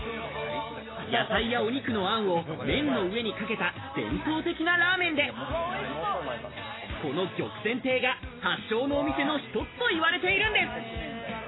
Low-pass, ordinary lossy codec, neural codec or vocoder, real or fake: 7.2 kHz; AAC, 16 kbps; none; real